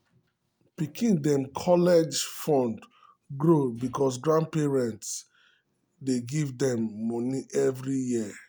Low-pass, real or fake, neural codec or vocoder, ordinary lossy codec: none; real; none; none